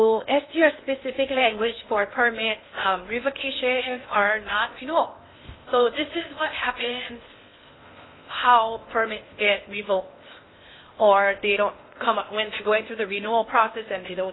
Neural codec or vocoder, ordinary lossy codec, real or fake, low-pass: codec, 16 kHz in and 24 kHz out, 0.6 kbps, FocalCodec, streaming, 4096 codes; AAC, 16 kbps; fake; 7.2 kHz